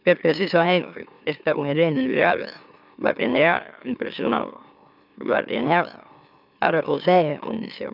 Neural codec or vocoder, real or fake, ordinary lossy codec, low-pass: autoencoder, 44.1 kHz, a latent of 192 numbers a frame, MeloTTS; fake; none; 5.4 kHz